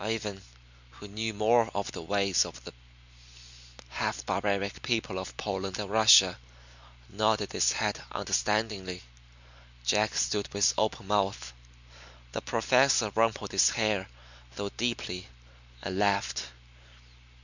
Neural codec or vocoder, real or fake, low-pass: none; real; 7.2 kHz